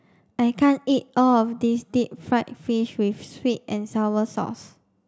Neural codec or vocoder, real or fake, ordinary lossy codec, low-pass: none; real; none; none